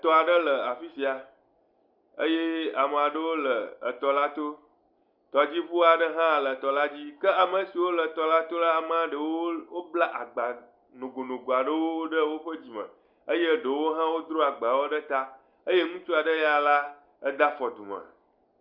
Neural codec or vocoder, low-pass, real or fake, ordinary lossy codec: none; 5.4 kHz; real; Opus, 64 kbps